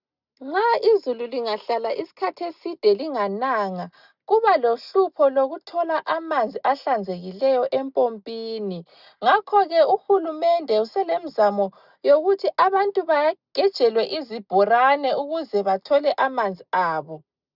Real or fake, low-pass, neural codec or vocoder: real; 5.4 kHz; none